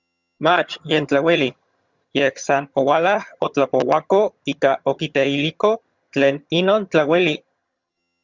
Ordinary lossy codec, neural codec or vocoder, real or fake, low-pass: Opus, 64 kbps; vocoder, 22.05 kHz, 80 mel bands, HiFi-GAN; fake; 7.2 kHz